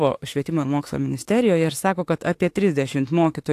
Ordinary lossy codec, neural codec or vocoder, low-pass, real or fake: AAC, 64 kbps; autoencoder, 48 kHz, 32 numbers a frame, DAC-VAE, trained on Japanese speech; 14.4 kHz; fake